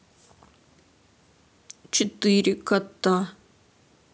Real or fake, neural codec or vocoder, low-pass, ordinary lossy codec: real; none; none; none